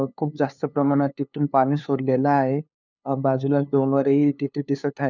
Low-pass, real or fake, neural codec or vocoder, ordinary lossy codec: 7.2 kHz; fake; codec, 16 kHz, 2 kbps, FunCodec, trained on LibriTTS, 25 frames a second; none